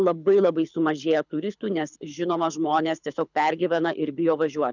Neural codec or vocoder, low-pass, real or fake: codec, 24 kHz, 6 kbps, HILCodec; 7.2 kHz; fake